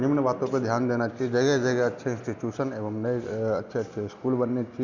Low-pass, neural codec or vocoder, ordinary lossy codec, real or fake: 7.2 kHz; none; none; real